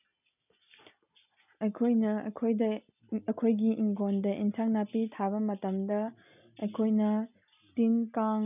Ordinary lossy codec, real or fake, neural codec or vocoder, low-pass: none; real; none; 3.6 kHz